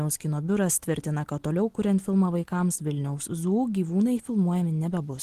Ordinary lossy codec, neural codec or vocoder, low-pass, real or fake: Opus, 16 kbps; none; 14.4 kHz; real